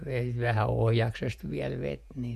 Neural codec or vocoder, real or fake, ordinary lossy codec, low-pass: vocoder, 48 kHz, 128 mel bands, Vocos; fake; none; 14.4 kHz